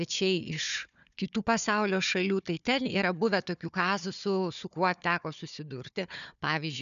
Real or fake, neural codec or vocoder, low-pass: fake; codec, 16 kHz, 4 kbps, FunCodec, trained on Chinese and English, 50 frames a second; 7.2 kHz